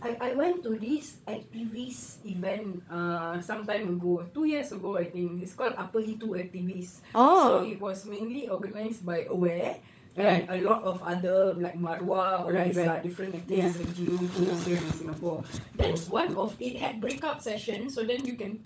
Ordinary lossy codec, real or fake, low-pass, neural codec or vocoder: none; fake; none; codec, 16 kHz, 16 kbps, FunCodec, trained on LibriTTS, 50 frames a second